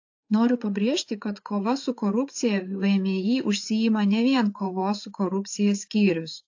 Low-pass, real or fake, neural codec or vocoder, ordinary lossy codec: 7.2 kHz; fake; codec, 16 kHz, 8 kbps, FreqCodec, larger model; AAC, 48 kbps